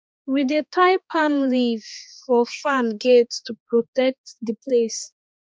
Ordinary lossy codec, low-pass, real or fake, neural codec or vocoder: none; none; fake; codec, 16 kHz, 2 kbps, X-Codec, HuBERT features, trained on balanced general audio